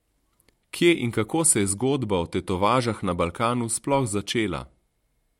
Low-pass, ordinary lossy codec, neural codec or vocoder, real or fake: 19.8 kHz; MP3, 64 kbps; none; real